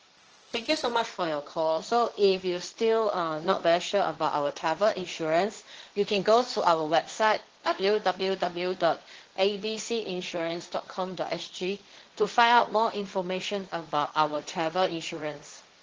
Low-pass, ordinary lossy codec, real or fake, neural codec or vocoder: 7.2 kHz; Opus, 16 kbps; fake; codec, 16 kHz, 1.1 kbps, Voila-Tokenizer